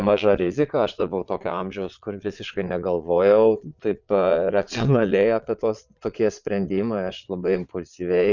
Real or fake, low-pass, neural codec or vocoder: fake; 7.2 kHz; vocoder, 22.05 kHz, 80 mel bands, Vocos